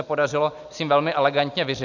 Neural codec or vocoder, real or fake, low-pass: none; real; 7.2 kHz